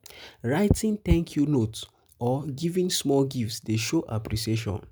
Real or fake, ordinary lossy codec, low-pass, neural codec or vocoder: real; none; none; none